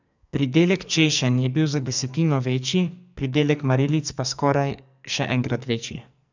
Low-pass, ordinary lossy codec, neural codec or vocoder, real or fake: 7.2 kHz; none; codec, 44.1 kHz, 2.6 kbps, SNAC; fake